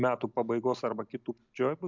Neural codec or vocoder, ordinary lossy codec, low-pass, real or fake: none; MP3, 64 kbps; 7.2 kHz; real